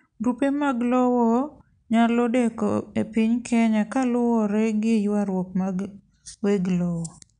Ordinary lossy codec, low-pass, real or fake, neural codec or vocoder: none; 9.9 kHz; real; none